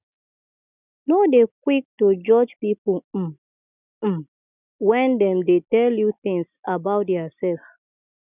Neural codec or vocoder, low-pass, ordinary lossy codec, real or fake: none; 3.6 kHz; none; real